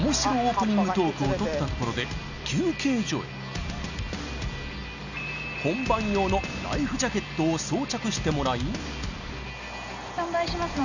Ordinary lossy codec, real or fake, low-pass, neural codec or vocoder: none; real; 7.2 kHz; none